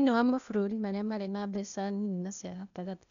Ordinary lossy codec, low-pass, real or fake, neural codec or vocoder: none; 7.2 kHz; fake; codec, 16 kHz, 0.8 kbps, ZipCodec